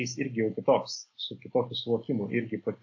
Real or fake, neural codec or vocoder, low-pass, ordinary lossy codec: real; none; 7.2 kHz; AAC, 48 kbps